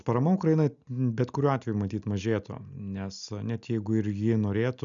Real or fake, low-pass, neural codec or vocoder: real; 7.2 kHz; none